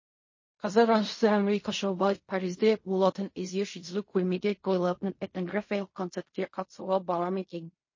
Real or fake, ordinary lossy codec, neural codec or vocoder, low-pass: fake; MP3, 32 kbps; codec, 16 kHz in and 24 kHz out, 0.4 kbps, LongCat-Audio-Codec, fine tuned four codebook decoder; 7.2 kHz